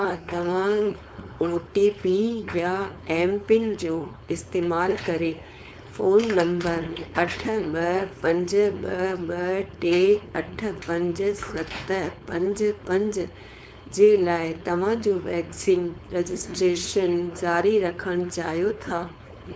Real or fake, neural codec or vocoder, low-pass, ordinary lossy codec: fake; codec, 16 kHz, 4.8 kbps, FACodec; none; none